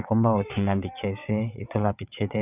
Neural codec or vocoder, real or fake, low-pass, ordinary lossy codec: vocoder, 22.05 kHz, 80 mel bands, WaveNeXt; fake; 3.6 kHz; none